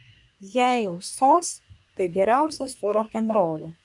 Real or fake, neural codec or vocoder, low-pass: fake; codec, 24 kHz, 1 kbps, SNAC; 10.8 kHz